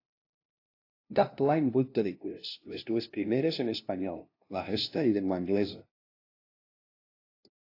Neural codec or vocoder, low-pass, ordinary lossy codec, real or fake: codec, 16 kHz, 0.5 kbps, FunCodec, trained on LibriTTS, 25 frames a second; 5.4 kHz; AAC, 32 kbps; fake